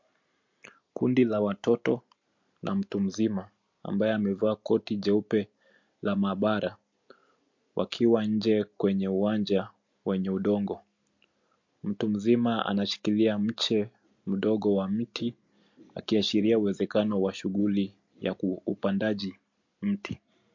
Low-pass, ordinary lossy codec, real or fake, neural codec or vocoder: 7.2 kHz; MP3, 48 kbps; real; none